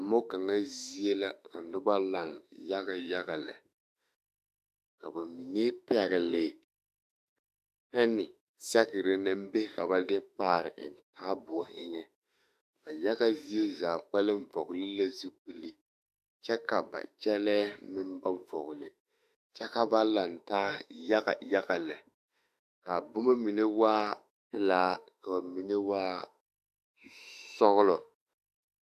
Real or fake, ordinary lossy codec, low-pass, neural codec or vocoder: fake; AAC, 96 kbps; 14.4 kHz; autoencoder, 48 kHz, 32 numbers a frame, DAC-VAE, trained on Japanese speech